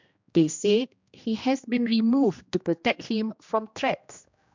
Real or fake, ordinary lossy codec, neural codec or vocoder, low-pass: fake; MP3, 64 kbps; codec, 16 kHz, 1 kbps, X-Codec, HuBERT features, trained on general audio; 7.2 kHz